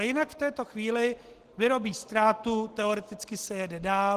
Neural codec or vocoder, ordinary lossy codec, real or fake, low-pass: none; Opus, 16 kbps; real; 14.4 kHz